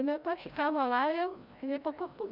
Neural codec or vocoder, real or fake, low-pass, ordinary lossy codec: codec, 16 kHz, 0.5 kbps, FreqCodec, larger model; fake; 5.4 kHz; none